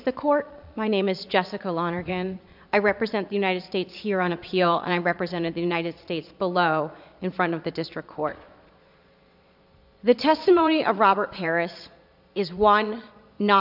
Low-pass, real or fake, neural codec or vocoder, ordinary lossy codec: 5.4 kHz; fake; vocoder, 22.05 kHz, 80 mel bands, Vocos; AAC, 48 kbps